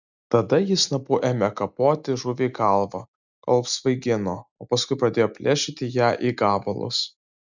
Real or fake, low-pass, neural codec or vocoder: real; 7.2 kHz; none